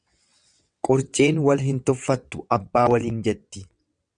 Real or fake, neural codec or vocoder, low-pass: fake; vocoder, 22.05 kHz, 80 mel bands, WaveNeXt; 9.9 kHz